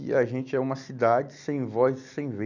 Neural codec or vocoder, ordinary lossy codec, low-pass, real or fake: none; none; 7.2 kHz; real